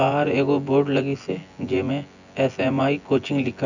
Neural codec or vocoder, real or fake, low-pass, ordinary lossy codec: vocoder, 24 kHz, 100 mel bands, Vocos; fake; 7.2 kHz; none